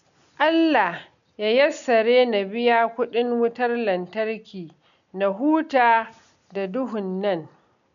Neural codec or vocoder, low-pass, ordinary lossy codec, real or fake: none; 7.2 kHz; none; real